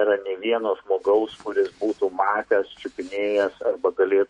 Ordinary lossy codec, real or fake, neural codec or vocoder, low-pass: MP3, 48 kbps; fake; autoencoder, 48 kHz, 128 numbers a frame, DAC-VAE, trained on Japanese speech; 19.8 kHz